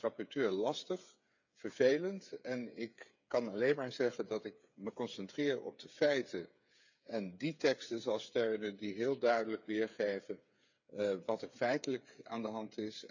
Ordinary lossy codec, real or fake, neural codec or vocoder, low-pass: none; fake; codec, 16 kHz, 8 kbps, FreqCodec, smaller model; 7.2 kHz